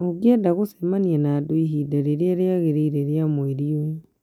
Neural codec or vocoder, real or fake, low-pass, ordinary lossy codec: none; real; 19.8 kHz; none